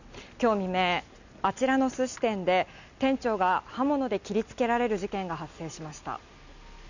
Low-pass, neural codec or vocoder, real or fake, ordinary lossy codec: 7.2 kHz; none; real; none